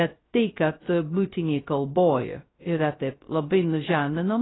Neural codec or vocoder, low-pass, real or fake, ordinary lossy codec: codec, 16 kHz, 0.2 kbps, FocalCodec; 7.2 kHz; fake; AAC, 16 kbps